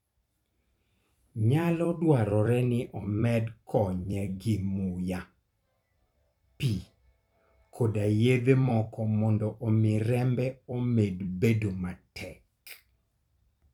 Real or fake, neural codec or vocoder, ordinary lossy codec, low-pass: fake; vocoder, 44.1 kHz, 128 mel bands every 256 samples, BigVGAN v2; none; 19.8 kHz